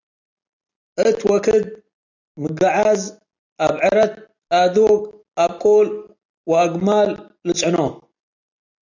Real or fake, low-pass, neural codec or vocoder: real; 7.2 kHz; none